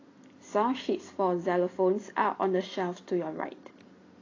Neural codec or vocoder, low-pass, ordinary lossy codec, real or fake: none; 7.2 kHz; AAC, 32 kbps; real